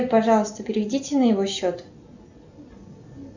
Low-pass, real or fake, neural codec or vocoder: 7.2 kHz; real; none